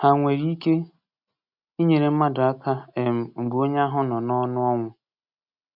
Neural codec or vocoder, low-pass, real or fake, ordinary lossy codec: none; 5.4 kHz; real; none